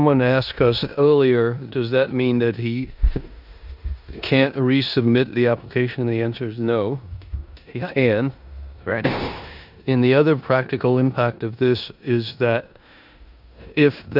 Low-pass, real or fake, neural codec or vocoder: 5.4 kHz; fake; codec, 16 kHz in and 24 kHz out, 0.9 kbps, LongCat-Audio-Codec, four codebook decoder